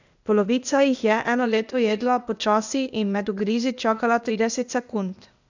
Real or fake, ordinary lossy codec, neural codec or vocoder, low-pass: fake; none; codec, 16 kHz, 0.8 kbps, ZipCodec; 7.2 kHz